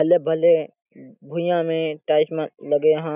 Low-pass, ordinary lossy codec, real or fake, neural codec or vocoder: 3.6 kHz; none; real; none